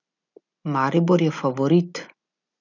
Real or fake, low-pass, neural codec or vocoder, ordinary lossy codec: real; 7.2 kHz; none; none